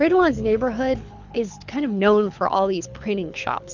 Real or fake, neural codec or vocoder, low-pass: fake; codec, 24 kHz, 6 kbps, HILCodec; 7.2 kHz